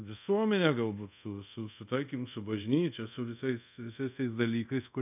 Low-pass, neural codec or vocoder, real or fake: 3.6 kHz; codec, 24 kHz, 0.5 kbps, DualCodec; fake